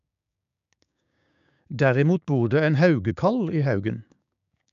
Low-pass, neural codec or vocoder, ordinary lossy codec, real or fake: 7.2 kHz; codec, 16 kHz, 6 kbps, DAC; none; fake